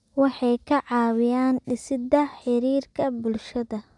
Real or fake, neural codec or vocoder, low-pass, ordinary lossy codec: real; none; 10.8 kHz; none